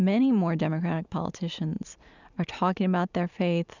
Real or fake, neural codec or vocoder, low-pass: real; none; 7.2 kHz